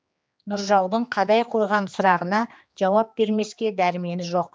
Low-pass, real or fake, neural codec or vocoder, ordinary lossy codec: none; fake; codec, 16 kHz, 2 kbps, X-Codec, HuBERT features, trained on general audio; none